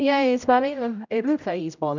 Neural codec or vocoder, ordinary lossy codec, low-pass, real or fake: codec, 16 kHz, 0.5 kbps, X-Codec, HuBERT features, trained on general audio; none; 7.2 kHz; fake